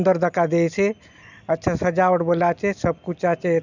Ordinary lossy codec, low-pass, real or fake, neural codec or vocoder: none; 7.2 kHz; real; none